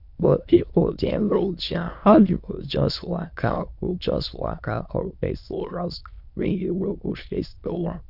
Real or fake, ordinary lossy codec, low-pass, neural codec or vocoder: fake; none; 5.4 kHz; autoencoder, 22.05 kHz, a latent of 192 numbers a frame, VITS, trained on many speakers